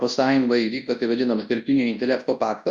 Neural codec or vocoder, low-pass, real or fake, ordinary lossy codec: codec, 24 kHz, 0.9 kbps, WavTokenizer, large speech release; 10.8 kHz; fake; Opus, 64 kbps